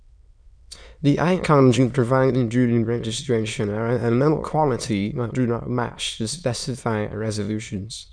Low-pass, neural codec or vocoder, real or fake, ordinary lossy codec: 9.9 kHz; autoencoder, 22.05 kHz, a latent of 192 numbers a frame, VITS, trained on many speakers; fake; none